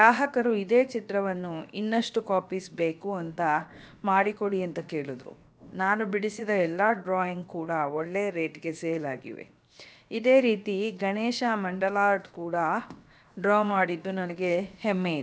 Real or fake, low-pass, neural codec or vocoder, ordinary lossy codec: fake; none; codec, 16 kHz, 0.7 kbps, FocalCodec; none